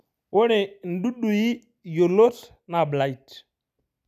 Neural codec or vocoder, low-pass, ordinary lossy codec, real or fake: none; 14.4 kHz; none; real